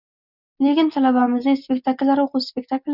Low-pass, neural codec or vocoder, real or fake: 5.4 kHz; none; real